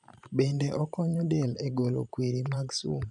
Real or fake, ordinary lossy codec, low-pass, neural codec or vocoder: fake; none; 10.8 kHz; vocoder, 44.1 kHz, 128 mel bands every 512 samples, BigVGAN v2